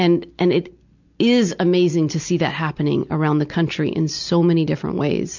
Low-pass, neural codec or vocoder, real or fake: 7.2 kHz; none; real